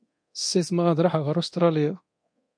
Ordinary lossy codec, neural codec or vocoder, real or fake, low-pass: MP3, 48 kbps; codec, 24 kHz, 0.9 kbps, DualCodec; fake; 9.9 kHz